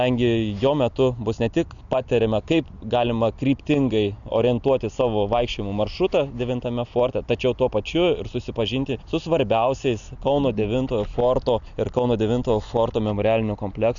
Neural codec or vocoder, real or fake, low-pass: none; real; 7.2 kHz